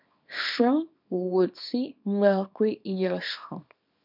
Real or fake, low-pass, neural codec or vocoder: fake; 5.4 kHz; codec, 24 kHz, 0.9 kbps, WavTokenizer, small release